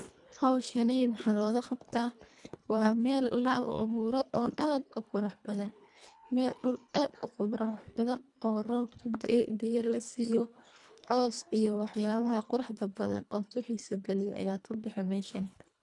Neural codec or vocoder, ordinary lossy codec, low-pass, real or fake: codec, 24 kHz, 1.5 kbps, HILCodec; none; 10.8 kHz; fake